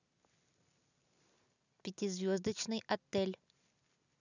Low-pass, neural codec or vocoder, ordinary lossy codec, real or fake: 7.2 kHz; none; none; real